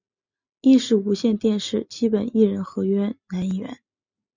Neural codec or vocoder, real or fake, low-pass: none; real; 7.2 kHz